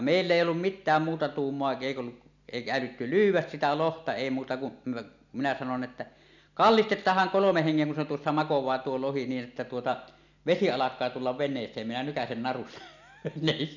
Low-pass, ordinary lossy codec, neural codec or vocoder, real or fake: 7.2 kHz; none; none; real